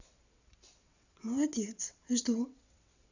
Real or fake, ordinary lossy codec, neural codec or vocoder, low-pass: fake; none; vocoder, 22.05 kHz, 80 mel bands, WaveNeXt; 7.2 kHz